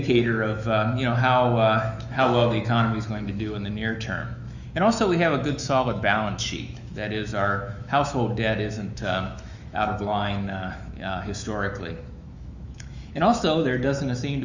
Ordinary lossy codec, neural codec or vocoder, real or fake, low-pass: Opus, 64 kbps; autoencoder, 48 kHz, 128 numbers a frame, DAC-VAE, trained on Japanese speech; fake; 7.2 kHz